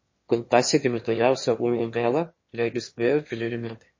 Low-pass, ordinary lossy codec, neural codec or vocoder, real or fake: 7.2 kHz; MP3, 32 kbps; autoencoder, 22.05 kHz, a latent of 192 numbers a frame, VITS, trained on one speaker; fake